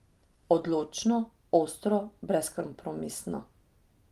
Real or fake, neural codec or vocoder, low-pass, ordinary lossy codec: real; none; 14.4 kHz; Opus, 24 kbps